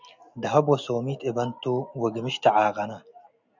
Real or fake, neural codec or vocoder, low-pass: real; none; 7.2 kHz